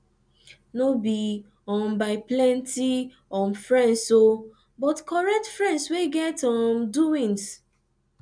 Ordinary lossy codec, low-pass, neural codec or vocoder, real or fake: none; 9.9 kHz; none; real